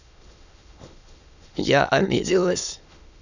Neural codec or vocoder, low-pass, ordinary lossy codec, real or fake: autoencoder, 22.05 kHz, a latent of 192 numbers a frame, VITS, trained on many speakers; 7.2 kHz; none; fake